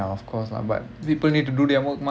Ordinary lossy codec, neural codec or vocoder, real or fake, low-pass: none; none; real; none